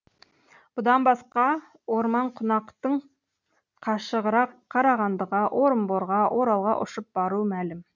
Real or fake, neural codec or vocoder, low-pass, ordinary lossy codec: real; none; 7.2 kHz; none